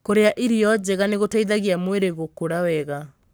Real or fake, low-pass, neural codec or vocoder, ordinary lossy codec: fake; none; codec, 44.1 kHz, 7.8 kbps, Pupu-Codec; none